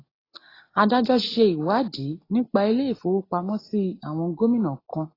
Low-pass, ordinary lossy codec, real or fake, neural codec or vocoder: 5.4 kHz; AAC, 24 kbps; real; none